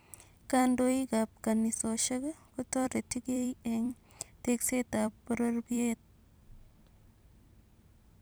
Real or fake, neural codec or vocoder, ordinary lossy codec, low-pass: fake; vocoder, 44.1 kHz, 128 mel bands every 256 samples, BigVGAN v2; none; none